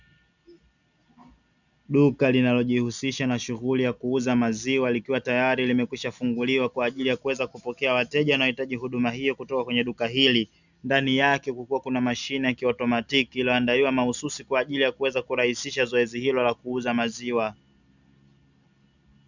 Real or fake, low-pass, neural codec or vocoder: real; 7.2 kHz; none